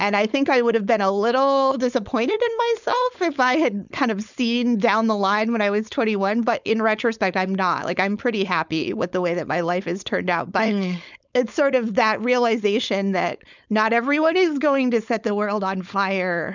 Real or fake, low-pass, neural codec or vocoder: fake; 7.2 kHz; codec, 16 kHz, 4.8 kbps, FACodec